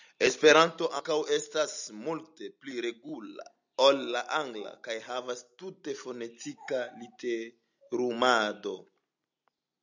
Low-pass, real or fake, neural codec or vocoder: 7.2 kHz; real; none